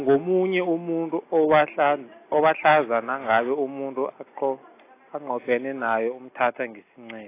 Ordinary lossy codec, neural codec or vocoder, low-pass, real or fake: AAC, 24 kbps; none; 3.6 kHz; real